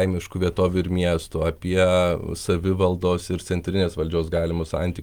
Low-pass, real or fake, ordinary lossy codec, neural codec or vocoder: 19.8 kHz; real; Opus, 32 kbps; none